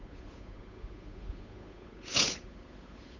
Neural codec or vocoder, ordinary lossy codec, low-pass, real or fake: codec, 16 kHz, 8 kbps, FunCodec, trained on Chinese and English, 25 frames a second; none; 7.2 kHz; fake